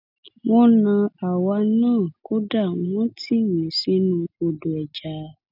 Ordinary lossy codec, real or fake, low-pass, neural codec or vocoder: none; real; 5.4 kHz; none